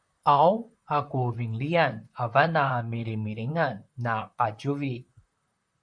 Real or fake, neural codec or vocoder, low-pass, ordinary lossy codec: fake; vocoder, 44.1 kHz, 128 mel bands, Pupu-Vocoder; 9.9 kHz; MP3, 64 kbps